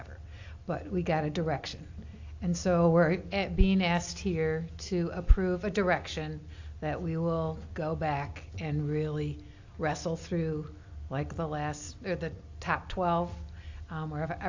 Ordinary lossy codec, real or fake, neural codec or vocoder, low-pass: AAC, 48 kbps; real; none; 7.2 kHz